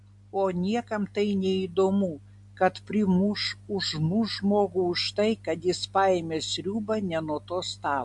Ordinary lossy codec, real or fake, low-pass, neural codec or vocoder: MP3, 64 kbps; real; 10.8 kHz; none